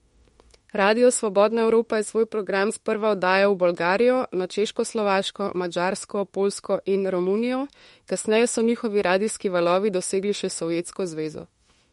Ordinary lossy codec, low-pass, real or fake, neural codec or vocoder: MP3, 48 kbps; 19.8 kHz; fake; autoencoder, 48 kHz, 32 numbers a frame, DAC-VAE, trained on Japanese speech